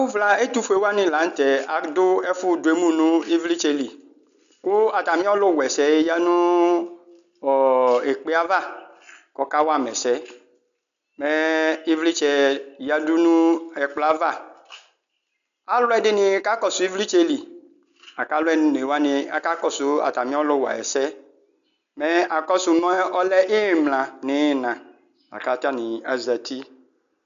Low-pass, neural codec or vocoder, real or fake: 7.2 kHz; none; real